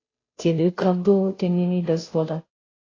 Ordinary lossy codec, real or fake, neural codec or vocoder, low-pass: AAC, 32 kbps; fake; codec, 16 kHz, 0.5 kbps, FunCodec, trained on Chinese and English, 25 frames a second; 7.2 kHz